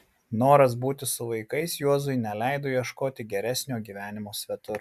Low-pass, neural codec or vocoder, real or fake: 14.4 kHz; none; real